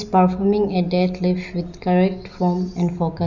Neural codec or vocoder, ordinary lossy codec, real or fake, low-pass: none; none; real; 7.2 kHz